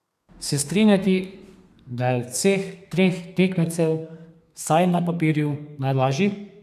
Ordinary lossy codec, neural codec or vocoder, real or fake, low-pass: none; codec, 32 kHz, 1.9 kbps, SNAC; fake; 14.4 kHz